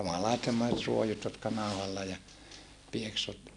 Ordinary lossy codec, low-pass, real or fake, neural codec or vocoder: none; 10.8 kHz; real; none